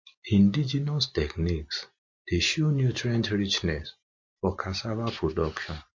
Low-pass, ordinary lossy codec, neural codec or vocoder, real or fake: 7.2 kHz; MP3, 48 kbps; none; real